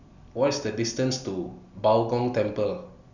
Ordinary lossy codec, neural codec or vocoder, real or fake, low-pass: none; none; real; 7.2 kHz